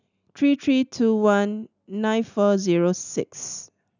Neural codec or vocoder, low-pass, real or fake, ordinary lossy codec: none; 7.2 kHz; real; none